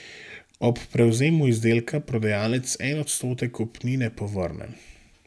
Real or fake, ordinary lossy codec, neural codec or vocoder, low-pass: real; none; none; none